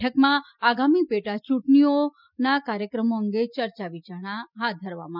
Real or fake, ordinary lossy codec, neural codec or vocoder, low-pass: real; none; none; 5.4 kHz